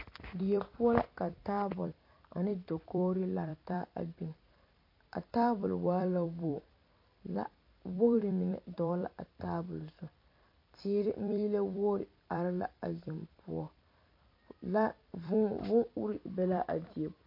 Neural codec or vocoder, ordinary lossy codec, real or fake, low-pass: vocoder, 44.1 kHz, 128 mel bands every 256 samples, BigVGAN v2; MP3, 32 kbps; fake; 5.4 kHz